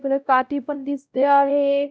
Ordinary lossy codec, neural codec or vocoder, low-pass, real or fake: none; codec, 16 kHz, 0.5 kbps, X-Codec, WavLM features, trained on Multilingual LibriSpeech; none; fake